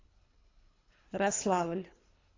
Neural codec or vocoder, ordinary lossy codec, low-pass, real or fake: codec, 24 kHz, 3 kbps, HILCodec; AAC, 32 kbps; 7.2 kHz; fake